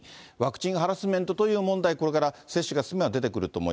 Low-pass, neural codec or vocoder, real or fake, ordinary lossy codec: none; none; real; none